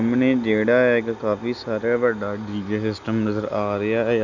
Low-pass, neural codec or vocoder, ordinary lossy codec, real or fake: 7.2 kHz; none; none; real